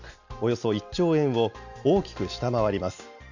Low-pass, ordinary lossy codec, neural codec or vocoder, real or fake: 7.2 kHz; none; none; real